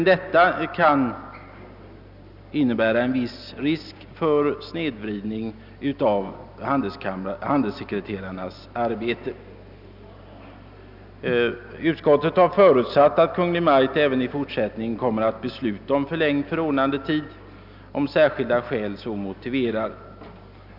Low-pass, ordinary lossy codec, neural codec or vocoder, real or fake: 5.4 kHz; none; none; real